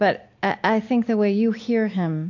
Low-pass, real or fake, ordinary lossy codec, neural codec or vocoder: 7.2 kHz; real; AAC, 48 kbps; none